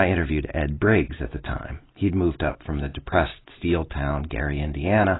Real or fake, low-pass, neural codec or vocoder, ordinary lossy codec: real; 7.2 kHz; none; AAC, 16 kbps